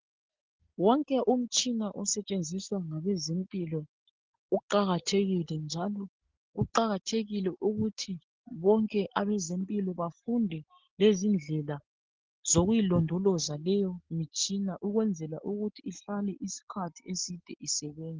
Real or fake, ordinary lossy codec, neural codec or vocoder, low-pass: real; Opus, 16 kbps; none; 7.2 kHz